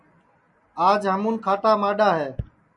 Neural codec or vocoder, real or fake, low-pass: none; real; 10.8 kHz